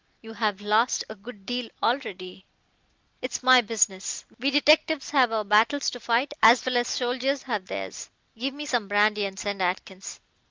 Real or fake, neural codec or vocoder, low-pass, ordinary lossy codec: real; none; 7.2 kHz; Opus, 24 kbps